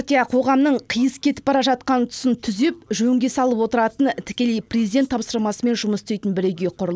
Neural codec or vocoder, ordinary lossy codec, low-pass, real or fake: none; none; none; real